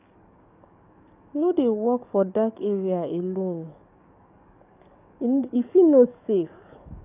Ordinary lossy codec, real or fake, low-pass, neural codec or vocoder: none; fake; 3.6 kHz; codec, 44.1 kHz, 7.8 kbps, DAC